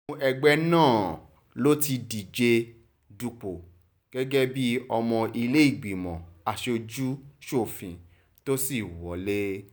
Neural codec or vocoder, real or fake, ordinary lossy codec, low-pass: none; real; none; none